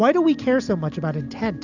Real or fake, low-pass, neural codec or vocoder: real; 7.2 kHz; none